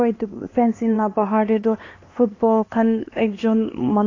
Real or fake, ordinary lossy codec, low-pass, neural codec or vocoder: fake; AAC, 32 kbps; 7.2 kHz; codec, 16 kHz, 2 kbps, X-Codec, HuBERT features, trained on LibriSpeech